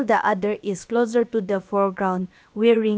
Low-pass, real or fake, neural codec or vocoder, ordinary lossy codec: none; fake; codec, 16 kHz, about 1 kbps, DyCAST, with the encoder's durations; none